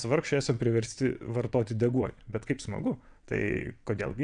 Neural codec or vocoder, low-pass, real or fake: vocoder, 22.05 kHz, 80 mel bands, WaveNeXt; 9.9 kHz; fake